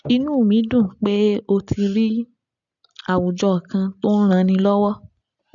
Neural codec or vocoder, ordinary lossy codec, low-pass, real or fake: none; none; 7.2 kHz; real